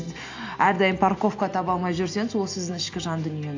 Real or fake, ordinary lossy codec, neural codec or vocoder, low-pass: real; AAC, 48 kbps; none; 7.2 kHz